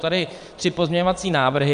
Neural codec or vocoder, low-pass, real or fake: vocoder, 22.05 kHz, 80 mel bands, Vocos; 9.9 kHz; fake